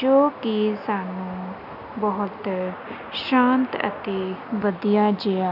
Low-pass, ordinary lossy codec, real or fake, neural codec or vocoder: 5.4 kHz; none; real; none